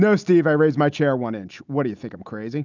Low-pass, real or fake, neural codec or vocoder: 7.2 kHz; real; none